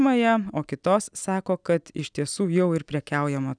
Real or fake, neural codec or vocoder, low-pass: real; none; 9.9 kHz